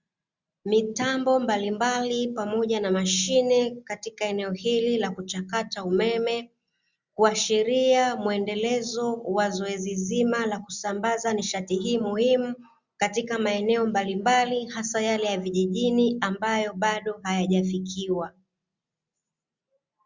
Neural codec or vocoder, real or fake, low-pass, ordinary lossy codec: none; real; 7.2 kHz; Opus, 64 kbps